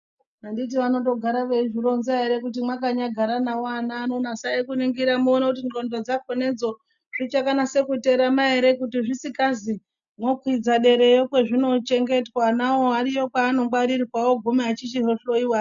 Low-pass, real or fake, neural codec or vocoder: 7.2 kHz; real; none